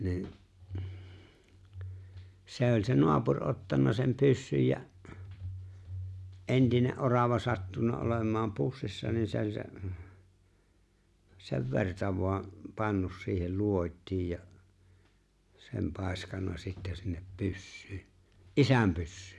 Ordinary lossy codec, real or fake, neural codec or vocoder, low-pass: none; real; none; none